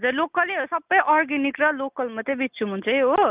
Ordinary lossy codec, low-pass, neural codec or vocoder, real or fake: Opus, 24 kbps; 3.6 kHz; none; real